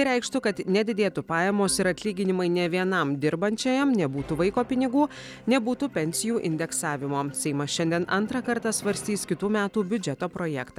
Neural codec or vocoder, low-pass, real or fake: none; 19.8 kHz; real